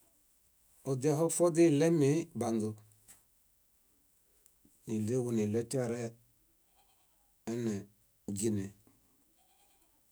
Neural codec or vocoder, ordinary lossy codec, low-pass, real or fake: autoencoder, 48 kHz, 128 numbers a frame, DAC-VAE, trained on Japanese speech; none; none; fake